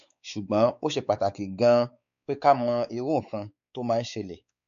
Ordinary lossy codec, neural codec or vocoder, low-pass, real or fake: none; codec, 16 kHz, 4 kbps, X-Codec, WavLM features, trained on Multilingual LibriSpeech; 7.2 kHz; fake